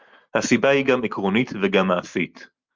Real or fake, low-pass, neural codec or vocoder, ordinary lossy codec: real; 7.2 kHz; none; Opus, 32 kbps